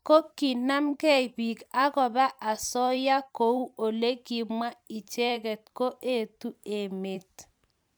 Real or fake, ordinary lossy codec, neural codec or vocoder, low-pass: fake; none; vocoder, 44.1 kHz, 128 mel bands every 512 samples, BigVGAN v2; none